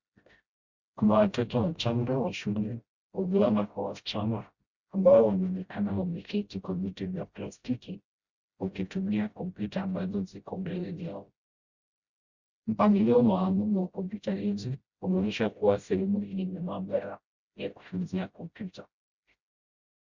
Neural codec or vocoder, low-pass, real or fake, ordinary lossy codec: codec, 16 kHz, 0.5 kbps, FreqCodec, smaller model; 7.2 kHz; fake; Opus, 64 kbps